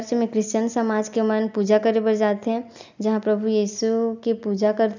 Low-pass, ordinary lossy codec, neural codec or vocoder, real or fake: 7.2 kHz; none; none; real